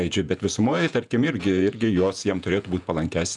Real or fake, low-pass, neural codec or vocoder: fake; 10.8 kHz; vocoder, 48 kHz, 128 mel bands, Vocos